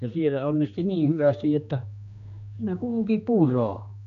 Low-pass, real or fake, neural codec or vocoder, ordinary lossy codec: 7.2 kHz; fake; codec, 16 kHz, 2 kbps, X-Codec, HuBERT features, trained on general audio; none